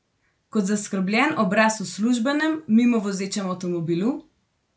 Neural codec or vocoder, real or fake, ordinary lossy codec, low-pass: none; real; none; none